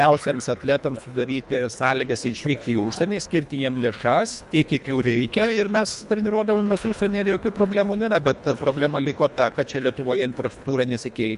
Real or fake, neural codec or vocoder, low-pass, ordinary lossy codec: fake; codec, 24 kHz, 1.5 kbps, HILCodec; 10.8 kHz; AAC, 96 kbps